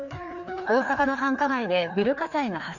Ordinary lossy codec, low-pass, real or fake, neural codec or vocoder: none; 7.2 kHz; fake; codec, 16 kHz, 2 kbps, FreqCodec, larger model